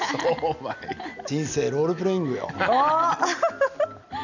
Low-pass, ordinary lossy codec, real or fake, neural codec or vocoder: 7.2 kHz; none; real; none